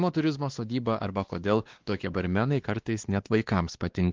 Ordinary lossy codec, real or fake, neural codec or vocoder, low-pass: Opus, 16 kbps; fake; codec, 16 kHz, 2 kbps, X-Codec, WavLM features, trained on Multilingual LibriSpeech; 7.2 kHz